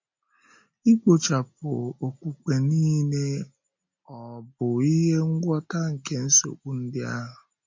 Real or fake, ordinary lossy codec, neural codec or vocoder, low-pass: real; MP3, 48 kbps; none; 7.2 kHz